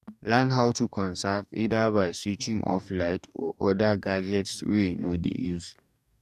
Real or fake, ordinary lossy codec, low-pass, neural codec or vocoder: fake; none; 14.4 kHz; codec, 44.1 kHz, 2.6 kbps, DAC